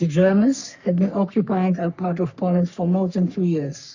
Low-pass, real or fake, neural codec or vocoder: 7.2 kHz; fake; codec, 44.1 kHz, 3.4 kbps, Pupu-Codec